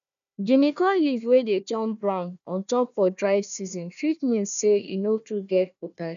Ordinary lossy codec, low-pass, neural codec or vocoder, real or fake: none; 7.2 kHz; codec, 16 kHz, 1 kbps, FunCodec, trained on Chinese and English, 50 frames a second; fake